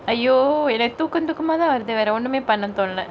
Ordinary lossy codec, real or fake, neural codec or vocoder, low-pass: none; real; none; none